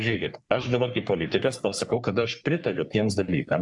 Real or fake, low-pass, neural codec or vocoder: fake; 10.8 kHz; codec, 44.1 kHz, 3.4 kbps, Pupu-Codec